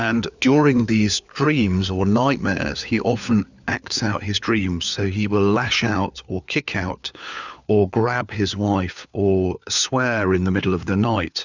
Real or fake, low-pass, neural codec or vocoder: fake; 7.2 kHz; codec, 16 kHz, 4 kbps, FunCodec, trained on LibriTTS, 50 frames a second